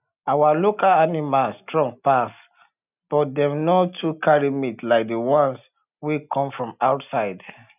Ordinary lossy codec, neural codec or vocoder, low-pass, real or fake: none; none; 3.6 kHz; real